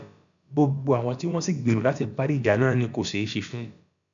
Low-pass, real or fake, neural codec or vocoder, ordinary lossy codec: 7.2 kHz; fake; codec, 16 kHz, about 1 kbps, DyCAST, with the encoder's durations; none